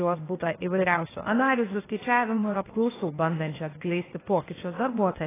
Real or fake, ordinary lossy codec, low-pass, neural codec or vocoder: fake; AAC, 16 kbps; 3.6 kHz; codec, 16 kHz, 0.8 kbps, ZipCodec